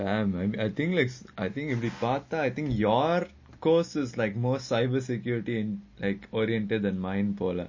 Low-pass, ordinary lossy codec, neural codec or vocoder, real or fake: 7.2 kHz; MP3, 32 kbps; none; real